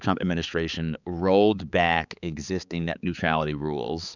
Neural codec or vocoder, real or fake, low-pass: codec, 16 kHz, 4 kbps, X-Codec, HuBERT features, trained on balanced general audio; fake; 7.2 kHz